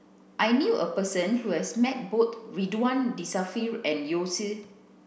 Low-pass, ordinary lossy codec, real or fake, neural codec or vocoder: none; none; real; none